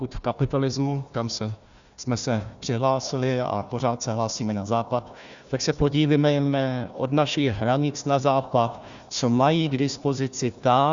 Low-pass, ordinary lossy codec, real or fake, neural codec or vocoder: 7.2 kHz; Opus, 64 kbps; fake; codec, 16 kHz, 1 kbps, FunCodec, trained on Chinese and English, 50 frames a second